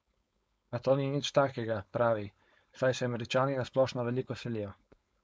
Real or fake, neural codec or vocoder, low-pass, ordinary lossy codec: fake; codec, 16 kHz, 4.8 kbps, FACodec; none; none